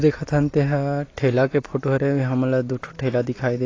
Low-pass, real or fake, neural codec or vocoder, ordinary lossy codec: 7.2 kHz; real; none; AAC, 32 kbps